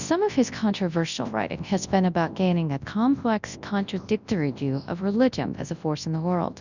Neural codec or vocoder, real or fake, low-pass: codec, 24 kHz, 0.9 kbps, WavTokenizer, large speech release; fake; 7.2 kHz